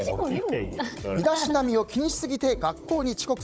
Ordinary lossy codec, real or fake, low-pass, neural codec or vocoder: none; fake; none; codec, 16 kHz, 16 kbps, FunCodec, trained on Chinese and English, 50 frames a second